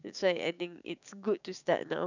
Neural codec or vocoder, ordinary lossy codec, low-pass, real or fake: codec, 16 kHz, 6 kbps, DAC; none; 7.2 kHz; fake